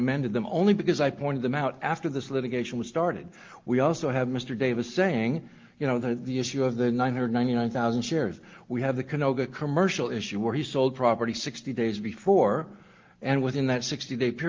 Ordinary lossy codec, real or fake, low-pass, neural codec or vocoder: Opus, 32 kbps; real; 7.2 kHz; none